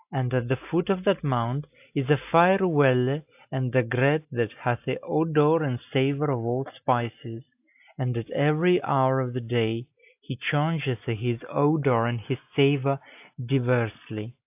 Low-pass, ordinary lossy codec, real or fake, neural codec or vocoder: 3.6 kHz; AAC, 32 kbps; real; none